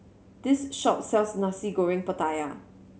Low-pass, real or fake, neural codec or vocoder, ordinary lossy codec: none; real; none; none